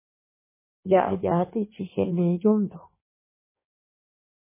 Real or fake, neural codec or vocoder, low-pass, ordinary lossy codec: fake; codec, 16 kHz in and 24 kHz out, 1.1 kbps, FireRedTTS-2 codec; 3.6 kHz; MP3, 16 kbps